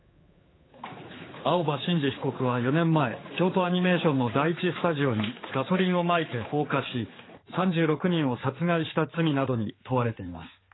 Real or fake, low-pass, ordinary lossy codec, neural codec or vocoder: fake; 7.2 kHz; AAC, 16 kbps; codec, 16 kHz, 4 kbps, X-Codec, HuBERT features, trained on general audio